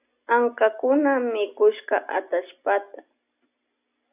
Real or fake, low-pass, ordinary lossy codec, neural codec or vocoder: real; 3.6 kHz; AAC, 32 kbps; none